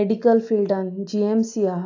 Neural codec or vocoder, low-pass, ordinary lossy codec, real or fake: none; 7.2 kHz; AAC, 48 kbps; real